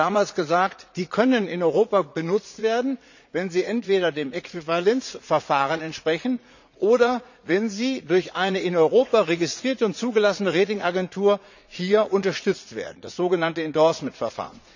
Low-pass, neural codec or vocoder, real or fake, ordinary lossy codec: 7.2 kHz; vocoder, 44.1 kHz, 80 mel bands, Vocos; fake; none